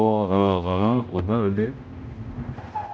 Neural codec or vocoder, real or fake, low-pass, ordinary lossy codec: codec, 16 kHz, 0.5 kbps, X-Codec, HuBERT features, trained on general audio; fake; none; none